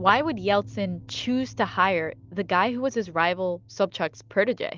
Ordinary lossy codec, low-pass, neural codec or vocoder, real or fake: Opus, 24 kbps; 7.2 kHz; none; real